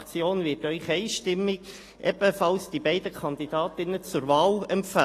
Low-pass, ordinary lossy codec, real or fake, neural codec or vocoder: 14.4 kHz; AAC, 48 kbps; real; none